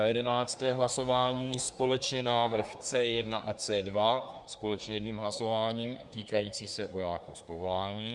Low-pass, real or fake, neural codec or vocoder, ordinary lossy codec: 10.8 kHz; fake; codec, 24 kHz, 1 kbps, SNAC; Opus, 64 kbps